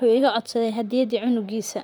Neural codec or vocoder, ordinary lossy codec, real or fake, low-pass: vocoder, 44.1 kHz, 128 mel bands, Pupu-Vocoder; none; fake; none